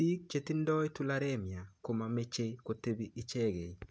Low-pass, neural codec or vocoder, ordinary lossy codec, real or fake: none; none; none; real